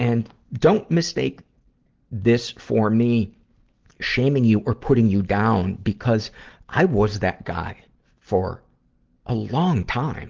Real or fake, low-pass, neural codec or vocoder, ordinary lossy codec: real; 7.2 kHz; none; Opus, 16 kbps